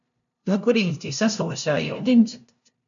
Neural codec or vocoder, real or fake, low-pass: codec, 16 kHz, 0.5 kbps, FunCodec, trained on LibriTTS, 25 frames a second; fake; 7.2 kHz